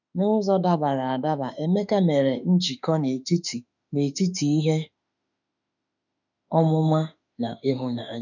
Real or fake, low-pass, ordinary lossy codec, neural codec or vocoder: fake; 7.2 kHz; none; autoencoder, 48 kHz, 32 numbers a frame, DAC-VAE, trained on Japanese speech